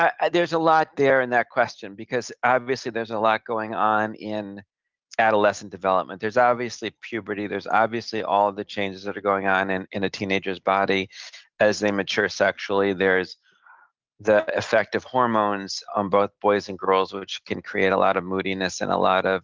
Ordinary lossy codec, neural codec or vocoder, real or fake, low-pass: Opus, 32 kbps; none; real; 7.2 kHz